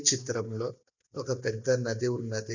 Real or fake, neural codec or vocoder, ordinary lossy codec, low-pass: real; none; none; 7.2 kHz